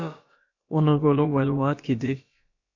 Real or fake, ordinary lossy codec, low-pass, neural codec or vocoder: fake; AAC, 48 kbps; 7.2 kHz; codec, 16 kHz, about 1 kbps, DyCAST, with the encoder's durations